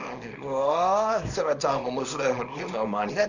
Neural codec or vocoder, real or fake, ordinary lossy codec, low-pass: codec, 24 kHz, 0.9 kbps, WavTokenizer, small release; fake; none; 7.2 kHz